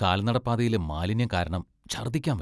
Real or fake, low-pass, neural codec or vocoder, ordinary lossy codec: real; none; none; none